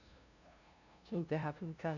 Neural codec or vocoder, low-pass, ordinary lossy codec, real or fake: codec, 16 kHz, 0.5 kbps, FunCodec, trained on LibriTTS, 25 frames a second; 7.2 kHz; none; fake